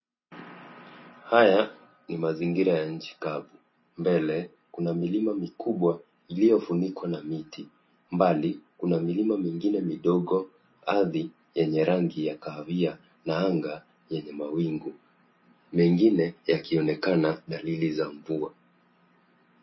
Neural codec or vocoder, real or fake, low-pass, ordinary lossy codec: none; real; 7.2 kHz; MP3, 24 kbps